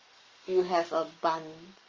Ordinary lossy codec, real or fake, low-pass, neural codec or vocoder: Opus, 32 kbps; fake; 7.2 kHz; codec, 44.1 kHz, 7.8 kbps, Pupu-Codec